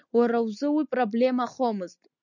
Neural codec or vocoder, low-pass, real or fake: none; 7.2 kHz; real